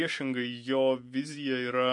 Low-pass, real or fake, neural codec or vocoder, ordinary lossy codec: 10.8 kHz; fake; vocoder, 44.1 kHz, 128 mel bands every 512 samples, BigVGAN v2; MP3, 48 kbps